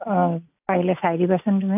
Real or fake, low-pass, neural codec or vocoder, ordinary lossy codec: real; 3.6 kHz; none; AAC, 32 kbps